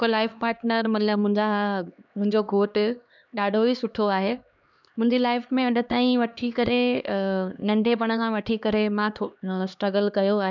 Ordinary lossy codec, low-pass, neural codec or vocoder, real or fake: none; 7.2 kHz; codec, 16 kHz, 2 kbps, X-Codec, HuBERT features, trained on LibriSpeech; fake